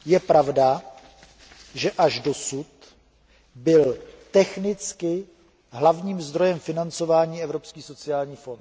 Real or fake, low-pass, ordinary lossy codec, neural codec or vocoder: real; none; none; none